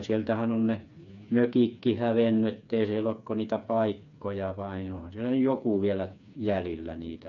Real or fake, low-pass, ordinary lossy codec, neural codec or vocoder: fake; 7.2 kHz; none; codec, 16 kHz, 4 kbps, FreqCodec, smaller model